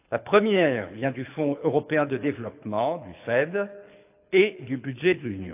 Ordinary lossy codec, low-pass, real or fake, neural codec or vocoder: AAC, 32 kbps; 3.6 kHz; fake; codec, 24 kHz, 6 kbps, HILCodec